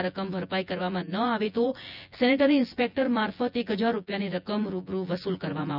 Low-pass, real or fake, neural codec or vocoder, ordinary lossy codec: 5.4 kHz; fake; vocoder, 24 kHz, 100 mel bands, Vocos; none